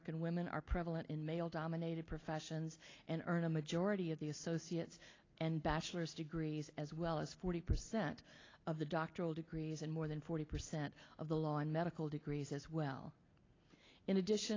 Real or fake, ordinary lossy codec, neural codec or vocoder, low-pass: real; AAC, 32 kbps; none; 7.2 kHz